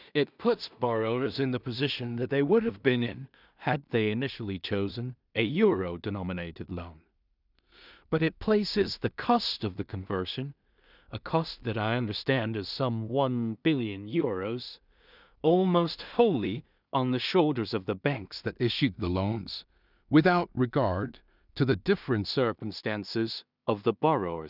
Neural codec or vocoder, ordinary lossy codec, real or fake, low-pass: codec, 16 kHz in and 24 kHz out, 0.4 kbps, LongCat-Audio-Codec, two codebook decoder; none; fake; 5.4 kHz